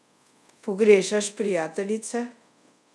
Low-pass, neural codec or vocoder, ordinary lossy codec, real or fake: none; codec, 24 kHz, 0.5 kbps, DualCodec; none; fake